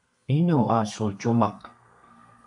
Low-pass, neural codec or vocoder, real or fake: 10.8 kHz; codec, 32 kHz, 1.9 kbps, SNAC; fake